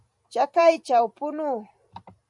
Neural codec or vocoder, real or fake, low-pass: vocoder, 44.1 kHz, 128 mel bands every 512 samples, BigVGAN v2; fake; 10.8 kHz